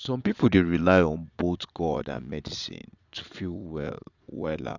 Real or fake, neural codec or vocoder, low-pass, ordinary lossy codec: fake; vocoder, 22.05 kHz, 80 mel bands, Vocos; 7.2 kHz; none